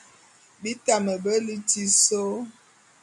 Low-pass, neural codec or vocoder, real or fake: 10.8 kHz; none; real